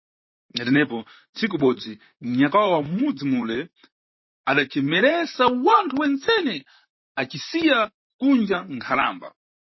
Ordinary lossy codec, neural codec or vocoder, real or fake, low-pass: MP3, 24 kbps; vocoder, 44.1 kHz, 128 mel bands, Pupu-Vocoder; fake; 7.2 kHz